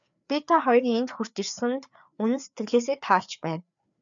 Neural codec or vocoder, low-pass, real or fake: codec, 16 kHz, 4 kbps, FreqCodec, larger model; 7.2 kHz; fake